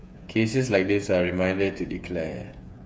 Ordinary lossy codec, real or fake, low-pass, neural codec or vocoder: none; fake; none; codec, 16 kHz, 8 kbps, FreqCodec, smaller model